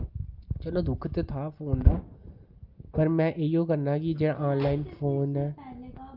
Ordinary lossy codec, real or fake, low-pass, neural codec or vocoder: Opus, 24 kbps; real; 5.4 kHz; none